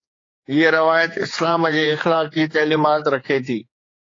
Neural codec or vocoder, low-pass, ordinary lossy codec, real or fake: codec, 16 kHz, 4 kbps, X-Codec, HuBERT features, trained on general audio; 7.2 kHz; AAC, 32 kbps; fake